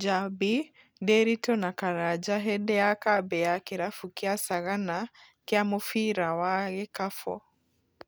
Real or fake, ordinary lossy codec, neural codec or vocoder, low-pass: real; none; none; none